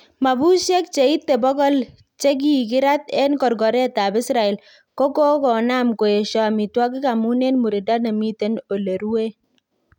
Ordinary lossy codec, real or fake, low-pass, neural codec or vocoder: none; real; 19.8 kHz; none